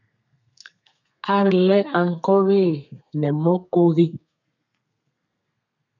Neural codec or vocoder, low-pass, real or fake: codec, 32 kHz, 1.9 kbps, SNAC; 7.2 kHz; fake